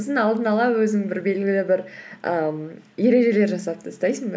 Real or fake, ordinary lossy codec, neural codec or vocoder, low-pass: real; none; none; none